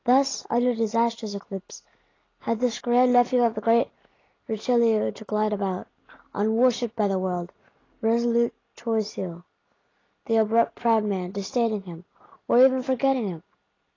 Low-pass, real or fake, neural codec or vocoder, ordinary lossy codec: 7.2 kHz; real; none; AAC, 32 kbps